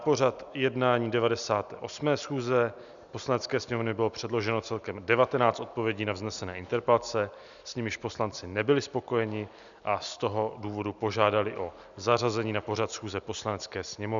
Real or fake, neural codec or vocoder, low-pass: real; none; 7.2 kHz